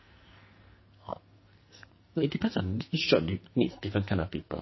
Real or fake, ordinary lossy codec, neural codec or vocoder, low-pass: fake; MP3, 24 kbps; codec, 44.1 kHz, 2.6 kbps, SNAC; 7.2 kHz